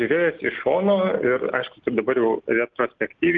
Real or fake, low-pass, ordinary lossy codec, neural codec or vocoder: real; 7.2 kHz; Opus, 24 kbps; none